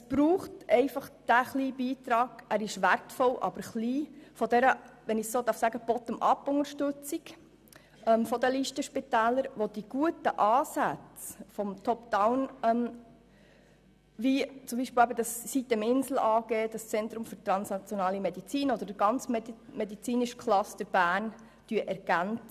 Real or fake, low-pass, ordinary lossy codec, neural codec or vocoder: real; 14.4 kHz; none; none